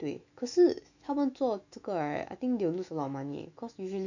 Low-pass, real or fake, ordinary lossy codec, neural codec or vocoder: 7.2 kHz; fake; none; autoencoder, 48 kHz, 128 numbers a frame, DAC-VAE, trained on Japanese speech